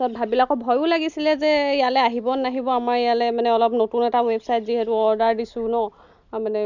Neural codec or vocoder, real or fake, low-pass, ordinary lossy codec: none; real; 7.2 kHz; none